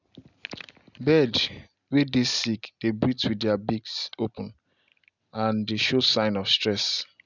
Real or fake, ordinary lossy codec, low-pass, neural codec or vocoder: real; none; 7.2 kHz; none